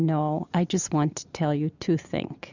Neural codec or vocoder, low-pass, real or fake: none; 7.2 kHz; real